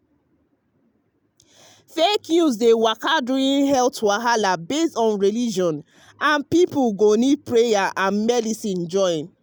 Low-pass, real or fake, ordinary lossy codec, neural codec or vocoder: none; real; none; none